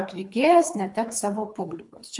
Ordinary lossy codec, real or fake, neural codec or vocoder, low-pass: MP3, 64 kbps; fake; codec, 24 kHz, 3 kbps, HILCodec; 10.8 kHz